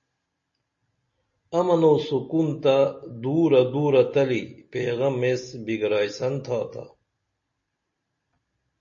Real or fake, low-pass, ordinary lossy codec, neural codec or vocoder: real; 7.2 kHz; MP3, 32 kbps; none